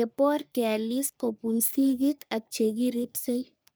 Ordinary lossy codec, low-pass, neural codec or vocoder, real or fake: none; none; codec, 44.1 kHz, 3.4 kbps, Pupu-Codec; fake